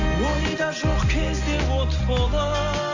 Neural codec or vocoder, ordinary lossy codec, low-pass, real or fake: none; Opus, 64 kbps; 7.2 kHz; real